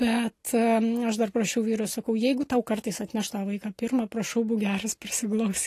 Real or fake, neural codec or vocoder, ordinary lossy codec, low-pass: real; none; AAC, 48 kbps; 14.4 kHz